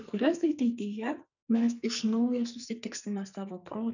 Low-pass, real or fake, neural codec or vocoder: 7.2 kHz; fake; codec, 44.1 kHz, 2.6 kbps, SNAC